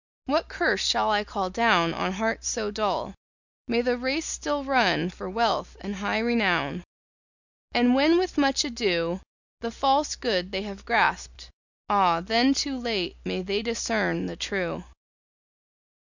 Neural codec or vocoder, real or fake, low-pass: none; real; 7.2 kHz